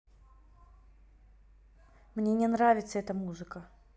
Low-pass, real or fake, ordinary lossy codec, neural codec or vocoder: none; real; none; none